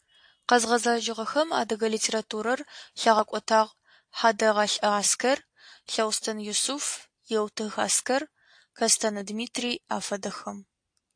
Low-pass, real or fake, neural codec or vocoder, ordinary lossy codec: 9.9 kHz; real; none; AAC, 64 kbps